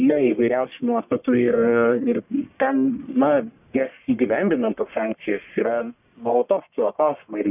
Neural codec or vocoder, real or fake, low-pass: codec, 44.1 kHz, 1.7 kbps, Pupu-Codec; fake; 3.6 kHz